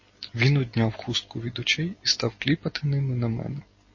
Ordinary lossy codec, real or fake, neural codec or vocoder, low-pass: MP3, 32 kbps; real; none; 7.2 kHz